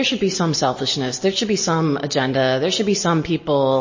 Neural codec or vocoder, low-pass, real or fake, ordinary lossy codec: none; 7.2 kHz; real; MP3, 32 kbps